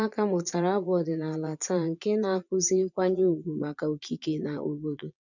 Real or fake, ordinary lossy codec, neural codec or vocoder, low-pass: fake; none; vocoder, 22.05 kHz, 80 mel bands, Vocos; 7.2 kHz